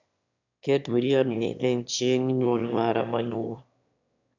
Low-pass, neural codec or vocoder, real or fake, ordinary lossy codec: 7.2 kHz; autoencoder, 22.05 kHz, a latent of 192 numbers a frame, VITS, trained on one speaker; fake; none